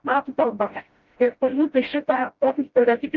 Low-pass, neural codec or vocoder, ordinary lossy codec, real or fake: 7.2 kHz; codec, 16 kHz, 0.5 kbps, FreqCodec, smaller model; Opus, 32 kbps; fake